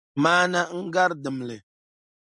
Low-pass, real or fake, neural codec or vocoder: 10.8 kHz; real; none